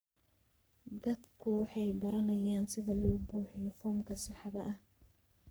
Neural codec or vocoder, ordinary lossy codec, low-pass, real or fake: codec, 44.1 kHz, 3.4 kbps, Pupu-Codec; none; none; fake